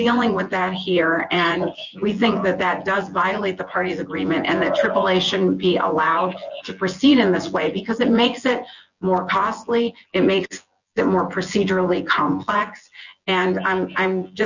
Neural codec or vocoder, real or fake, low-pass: vocoder, 24 kHz, 100 mel bands, Vocos; fake; 7.2 kHz